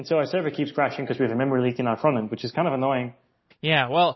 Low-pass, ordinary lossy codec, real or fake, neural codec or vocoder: 7.2 kHz; MP3, 24 kbps; real; none